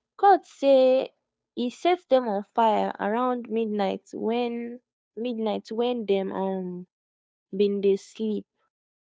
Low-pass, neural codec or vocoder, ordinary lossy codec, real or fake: none; codec, 16 kHz, 2 kbps, FunCodec, trained on Chinese and English, 25 frames a second; none; fake